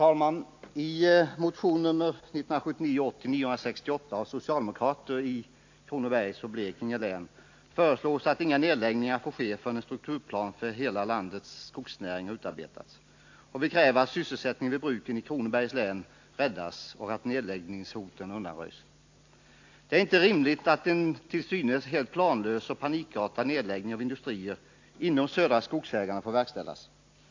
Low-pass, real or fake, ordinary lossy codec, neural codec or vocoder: 7.2 kHz; real; AAC, 48 kbps; none